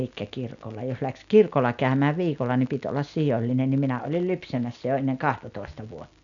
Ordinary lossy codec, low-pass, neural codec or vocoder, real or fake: none; 7.2 kHz; none; real